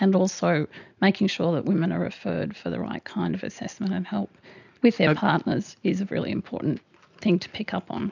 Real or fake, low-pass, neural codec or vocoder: real; 7.2 kHz; none